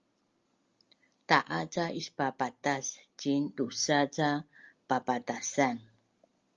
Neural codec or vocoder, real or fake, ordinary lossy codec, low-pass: none; real; Opus, 32 kbps; 7.2 kHz